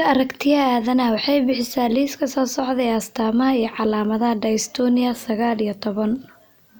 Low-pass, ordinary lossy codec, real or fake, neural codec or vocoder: none; none; real; none